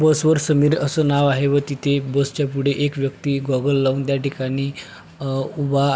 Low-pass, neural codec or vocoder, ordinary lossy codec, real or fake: none; none; none; real